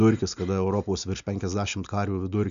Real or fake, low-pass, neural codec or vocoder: real; 7.2 kHz; none